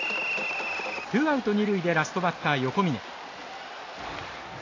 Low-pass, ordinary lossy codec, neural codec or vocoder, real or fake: 7.2 kHz; AAC, 32 kbps; none; real